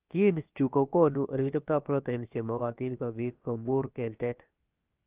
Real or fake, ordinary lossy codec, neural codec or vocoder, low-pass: fake; Opus, 32 kbps; codec, 16 kHz, about 1 kbps, DyCAST, with the encoder's durations; 3.6 kHz